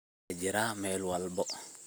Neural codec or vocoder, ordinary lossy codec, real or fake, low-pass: none; none; real; none